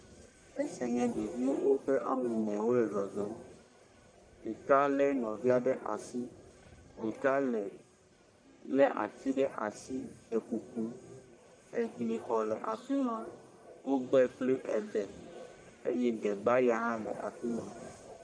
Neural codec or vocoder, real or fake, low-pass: codec, 44.1 kHz, 1.7 kbps, Pupu-Codec; fake; 9.9 kHz